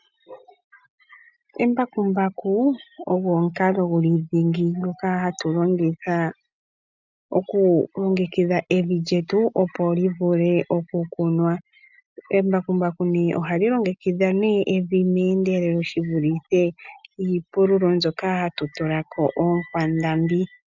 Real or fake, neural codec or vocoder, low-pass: real; none; 7.2 kHz